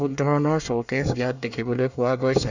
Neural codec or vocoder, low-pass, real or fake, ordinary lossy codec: codec, 24 kHz, 1 kbps, SNAC; 7.2 kHz; fake; none